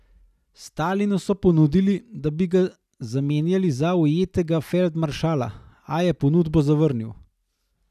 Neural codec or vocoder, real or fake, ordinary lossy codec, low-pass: none; real; none; 14.4 kHz